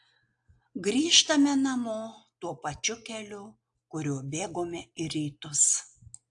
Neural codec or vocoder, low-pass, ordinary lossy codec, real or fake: none; 10.8 kHz; AAC, 64 kbps; real